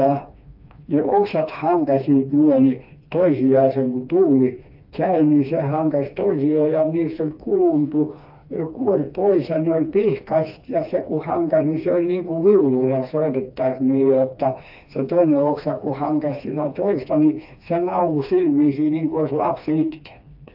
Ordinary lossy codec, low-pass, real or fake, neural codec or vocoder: AAC, 48 kbps; 5.4 kHz; fake; codec, 16 kHz, 2 kbps, FreqCodec, smaller model